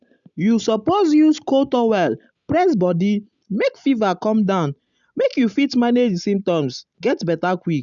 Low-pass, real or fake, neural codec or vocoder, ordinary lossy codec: 7.2 kHz; real; none; none